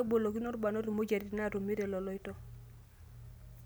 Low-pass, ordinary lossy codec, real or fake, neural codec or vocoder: none; none; real; none